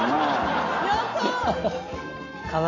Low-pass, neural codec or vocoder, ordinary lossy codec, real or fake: 7.2 kHz; none; none; real